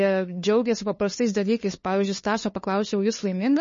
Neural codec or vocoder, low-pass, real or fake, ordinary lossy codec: codec, 16 kHz, 2 kbps, FunCodec, trained on LibriTTS, 25 frames a second; 7.2 kHz; fake; MP3, 32 kbps